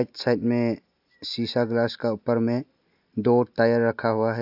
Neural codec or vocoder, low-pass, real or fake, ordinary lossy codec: none; 5.4 kHz; real; AAC, 48 kbps